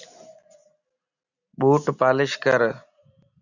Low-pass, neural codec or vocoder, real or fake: 7.2 kHz; none; real